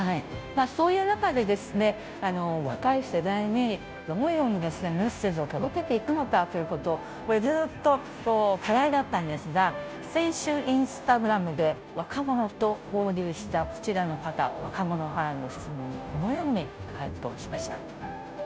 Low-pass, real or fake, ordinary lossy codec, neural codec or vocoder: none; fake; none; codec, 16 kHz, 0.5 kbps, FunCodec, trained on Chinese and English, 25 frames a second